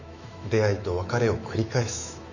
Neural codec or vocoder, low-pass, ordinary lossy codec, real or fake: autoencoder, 48 kHz, 128 numbers a frame, DAC-VAE, trained on Japanese speech; 7.2 kHz; none; fake